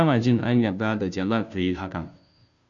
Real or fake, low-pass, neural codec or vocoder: fake; 7.2 kHz; codec, 16 kHz, 0.5 kbps, FunCodec, trained on Chinese and English, 25 frames a second